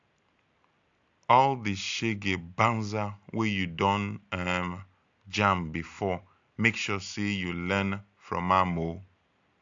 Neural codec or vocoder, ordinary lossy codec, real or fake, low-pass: none; AAC, 64 kbps; real; 7.2 kHz